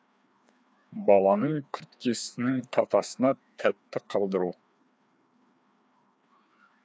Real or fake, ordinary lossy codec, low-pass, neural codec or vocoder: fake; none; none; codec, 16 kHz, 2 kbps, FreqCodec, larger model